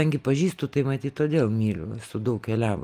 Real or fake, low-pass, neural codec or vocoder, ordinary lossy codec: real; 14.4 kHz; none; Opus, 32 kbps